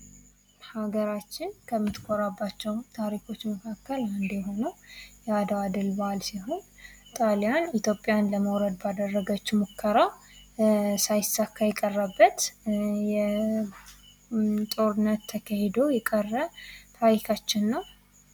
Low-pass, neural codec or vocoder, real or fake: 19.8 kHz; none; real